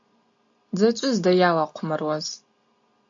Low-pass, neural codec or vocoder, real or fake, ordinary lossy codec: 7.2 kHz; none; real; AAC, 64 kbps